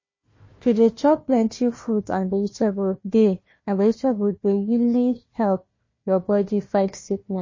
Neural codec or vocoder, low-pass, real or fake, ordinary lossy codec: codec, 16 kHz, 1 kbps, FunCodec, trained on Chinese and English, 50 frames a second; 7.2 kHz; fake; MP3, 32 kbps